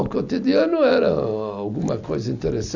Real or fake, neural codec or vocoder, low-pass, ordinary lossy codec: real; none; 7.2 kHz; none